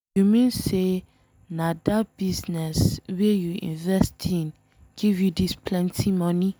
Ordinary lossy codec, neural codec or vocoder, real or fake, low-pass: none; none; real; none